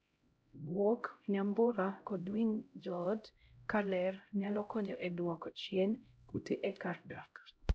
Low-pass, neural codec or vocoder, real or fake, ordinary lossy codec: none; codec, 16 kHz, 0.5 kbps, X-Codec, HuBERT features, trained on LibriSpeech; fake; none